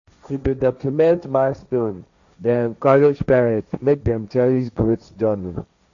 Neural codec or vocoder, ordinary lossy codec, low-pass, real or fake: codec, 16 kHz, 1.1 kbps, Voila-Tokenizer; none; 7.2 kHz; fake